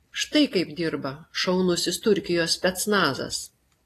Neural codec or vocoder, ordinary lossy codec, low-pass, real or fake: none; AAC, 48 kbps; 14.4 kHz; real